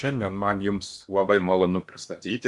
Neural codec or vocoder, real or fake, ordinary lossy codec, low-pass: codec, 16 kHz in and 24 kHz out, 0.8 kbps, FocalCodec, streaming, 65536 codes; fake; Opus, 64 kbps; 10.8 kHz